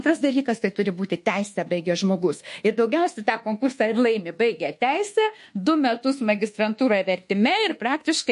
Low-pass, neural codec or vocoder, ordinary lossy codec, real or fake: 10.8 kHz; codec, 24 kHz, 1.2 kbps, DualCodec; MP3, 48 kbps; fake